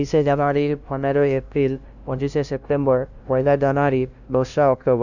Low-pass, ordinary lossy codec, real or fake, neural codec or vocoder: 7.2 kHz; none; fake; codec, 16 kHz, 0.5 kbps, FunCodec, trained on LibriTTS, 25 frames a second